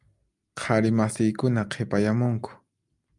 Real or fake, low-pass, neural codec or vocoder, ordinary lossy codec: real; 10.8 kHz; none; Opus, 32 kbps